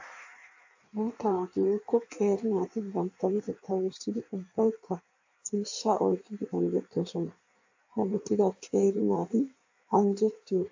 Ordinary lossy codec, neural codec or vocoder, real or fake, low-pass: AAC, 48 kbps; codec, 16 kHz in and 24 kHz out, 1.1 kbps, FireRedTTS-2 codec; fake; 7.2 kHz